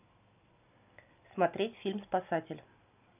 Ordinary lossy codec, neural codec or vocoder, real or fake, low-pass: none; none; real; 3.6 kHz